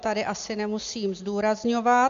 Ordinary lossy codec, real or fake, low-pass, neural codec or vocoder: AAC, 64 kbps; real; 7.2 kHz; none